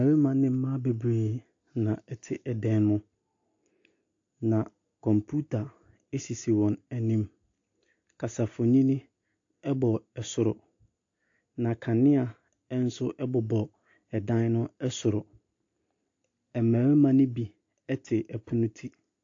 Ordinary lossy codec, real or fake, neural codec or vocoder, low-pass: AAC, 48 kbps; real; none; 7.2 kHz